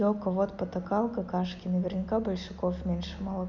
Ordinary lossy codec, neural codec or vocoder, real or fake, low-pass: none; none; real; 7.2 kHz